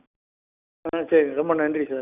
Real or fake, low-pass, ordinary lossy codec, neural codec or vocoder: real; 3.6 kHz; none; none